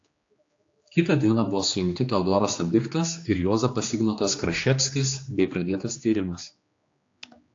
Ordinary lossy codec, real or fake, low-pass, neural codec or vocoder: AAC, 48 kbps; fake; 7.2 kHz; codec, 16 kHz, 2 kbps, X-Codec, HuBERT features, trained on general audio